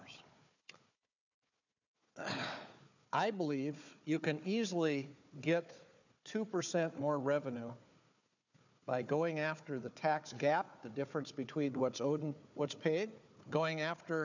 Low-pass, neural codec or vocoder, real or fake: 7.2 kHz; codec, 16 kHz, 4 kbps, FunCodec, trained on Chinese and English, 50 frames a second; fake